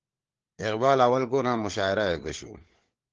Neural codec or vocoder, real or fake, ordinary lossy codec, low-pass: codec, 16 kHz, 4 kbps, FunCodec, trained on LibriTTS, 50 frames a second; fake; Opus, 16 kbps; 7.2 kHz